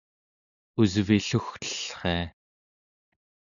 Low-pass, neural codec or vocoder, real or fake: 7.2 kHz; none; real